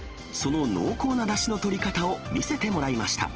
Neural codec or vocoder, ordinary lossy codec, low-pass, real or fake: none; Opus, 16 kbps; 7.2 kHz; real